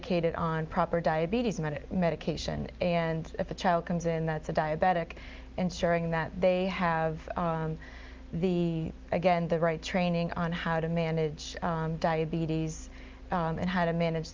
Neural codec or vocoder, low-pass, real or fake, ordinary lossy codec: none; 7.2 kHz; real; Opus, 32 kbps